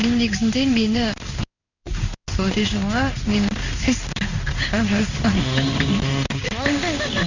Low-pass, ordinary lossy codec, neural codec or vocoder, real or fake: 7.2 kHz; none; codec, 16 kHz in and 24 kHz out, 1 kbps, XY-Tokenizer; fake